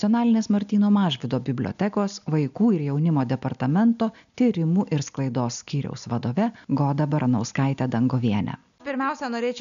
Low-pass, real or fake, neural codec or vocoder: 7.2 kHz; real; none